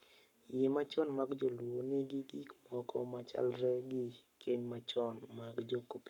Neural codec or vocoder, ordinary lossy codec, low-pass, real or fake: codec, 44.1 kHz, 7.8 kbps, Pupu-Codec; none; 19.8 kHz; fake